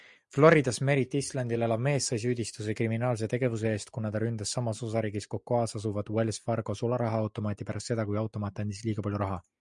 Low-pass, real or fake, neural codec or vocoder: 10.8 kHz; real; none